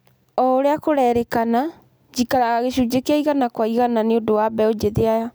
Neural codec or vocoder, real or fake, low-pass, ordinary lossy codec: none; real; none; none